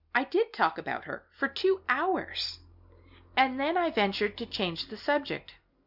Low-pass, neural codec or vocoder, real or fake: 5.4 kHz; none; real